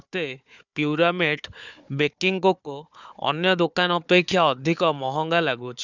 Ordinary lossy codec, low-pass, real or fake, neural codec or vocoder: none; 7.2 kHz; fake; codec, 16 kHz, 4 kbps, FunCodec, trained on Chinese and English, 50 frames a second